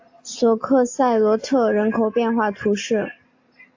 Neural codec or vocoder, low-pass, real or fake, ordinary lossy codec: none; 7.2 kHz; real; AAC, 48 kbps